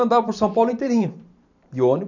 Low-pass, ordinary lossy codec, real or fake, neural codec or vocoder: 7.2 kHz; none; fake; vocoder, 44.1 kHz, 128 mel bands every 512 samples, BigVGAN v2